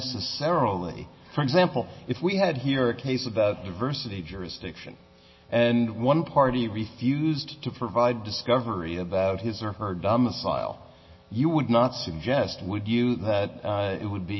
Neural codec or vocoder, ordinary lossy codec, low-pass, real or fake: none; MP3, 24 kbps; 7.2 kHz; real